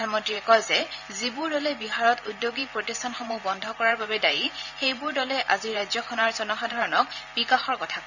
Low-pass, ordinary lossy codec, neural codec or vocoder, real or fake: 7.2 kHz; none; vocoder, 44.1 kHz, 128 mel bands every 512 samples, BigVGAN v2; fake